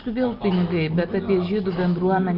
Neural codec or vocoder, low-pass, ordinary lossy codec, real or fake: none; 5.4 kHz; Opus, 24 kbps; real